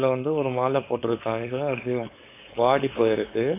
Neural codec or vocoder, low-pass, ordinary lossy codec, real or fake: codec, 16 kHz, 4.8 kbps, FACodec; 3.6 kHz; AAC, 24 kbps; fake